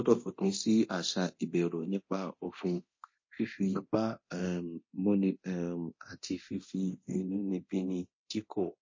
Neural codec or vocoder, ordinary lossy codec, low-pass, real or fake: codec, 24 kHz, 0.9 kbps, DualCodec; MP3, 32 kbps; 7.2 kHz; fake